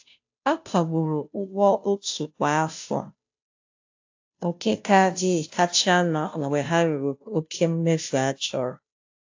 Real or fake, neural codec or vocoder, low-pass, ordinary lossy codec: fake; codec, 16 kHz, 0.5 kbps, FunCodec, trained on Chinese and English, 25 frames a second; 7.2 kHz; AAC, 48 kbps